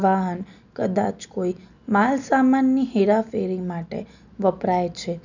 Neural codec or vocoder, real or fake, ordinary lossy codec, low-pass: none; real; none; 7.2 kHz